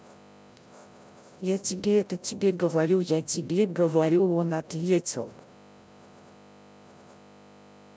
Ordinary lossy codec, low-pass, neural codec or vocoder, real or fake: none; none; codec, 16 kHz, 0.5 kbps, FreqCodec, larger model; fake